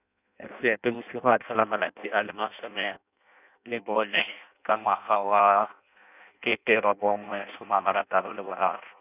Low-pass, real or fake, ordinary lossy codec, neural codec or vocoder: 3.6 kHz; fake; none; codec, 16 kHz in and 24 kHz out, 0.6 kbps, FireRedTTS-2 codec